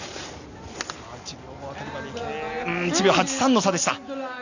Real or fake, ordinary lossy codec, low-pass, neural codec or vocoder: real; none; 7.2 kHz; none